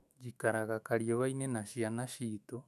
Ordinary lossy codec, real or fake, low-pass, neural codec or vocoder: none; fake; 14.4 kHz; autoencoder, 48 kHz, 128 numbers a frame, DAC-VAE, trained on Japanese speech